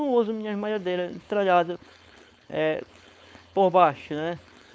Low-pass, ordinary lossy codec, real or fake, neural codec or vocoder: none; none; fake; codec, 16 kHz, 4.8 kbps, FACodec